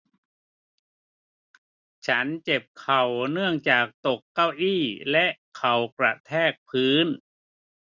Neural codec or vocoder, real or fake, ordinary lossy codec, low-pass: none; real; none; 7.2 kHz